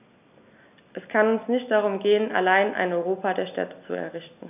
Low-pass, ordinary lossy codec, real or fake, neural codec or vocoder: 3.6 kHz; none; real; none